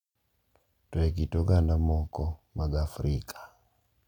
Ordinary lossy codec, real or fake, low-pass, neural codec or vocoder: none; real; 19.8 kHz; none